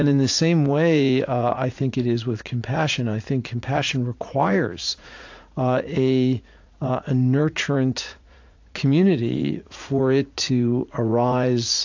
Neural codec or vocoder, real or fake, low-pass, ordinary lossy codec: vocoder, 44.1 kHz, 80 mel bands, Vocos; fake; 7.2 kHz; AAC, 48 kbps